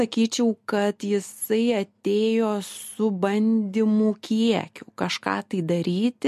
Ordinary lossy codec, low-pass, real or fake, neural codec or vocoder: MP3, 64 kbps; 14.4 kHz; real; none